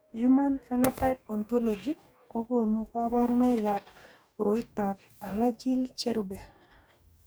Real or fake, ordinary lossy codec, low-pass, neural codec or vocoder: fake; none; none; codec, 44.1 kHz, 2.6 kbps, DAC